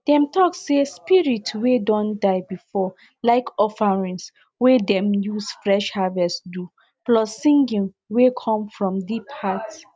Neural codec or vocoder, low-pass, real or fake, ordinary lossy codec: none; none; real; none